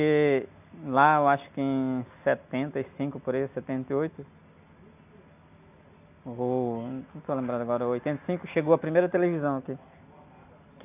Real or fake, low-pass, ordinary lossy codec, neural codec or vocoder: real; 3.6 kHz; none; none